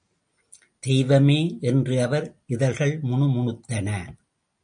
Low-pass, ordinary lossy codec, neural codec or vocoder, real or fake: 9.9 kHz; MP3, 48 kbps; none; real